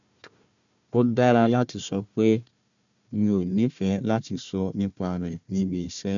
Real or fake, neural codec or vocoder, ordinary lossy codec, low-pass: fake; codec, 16 kHz, 1 kbps, FunCodec, trained on Chinese and English, 50 frames a second; none; 7.2 kHz